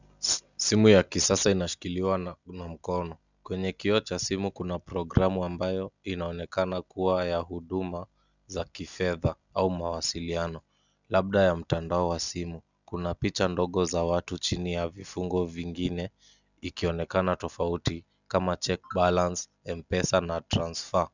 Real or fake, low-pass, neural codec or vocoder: real; 7.2 kHz; none